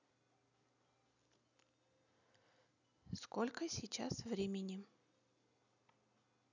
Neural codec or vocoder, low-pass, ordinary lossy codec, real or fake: none; 7.2 kHz; none; real